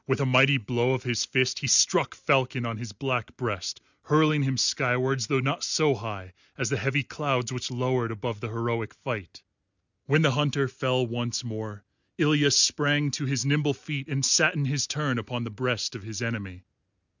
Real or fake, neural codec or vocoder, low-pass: real; none; 7.2 kHz